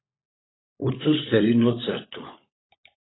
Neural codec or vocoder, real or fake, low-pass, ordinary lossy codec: codec, 16 kHz, 16 kbps, FunCodec, trained on LibriTTS, 50 frames a second; fake; 7.2 kHz; AAC, 16 kbps